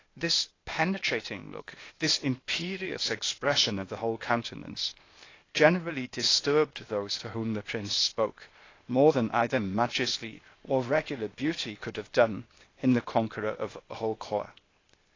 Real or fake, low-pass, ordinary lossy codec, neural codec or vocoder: fake; 7.2 kHz; AAC, 32 kbps; codec, 16 kHz, 0.8 kbps, ZipCodec